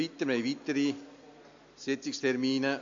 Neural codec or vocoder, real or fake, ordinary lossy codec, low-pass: none; real; MP3, 48 kbps; 7.2 kHz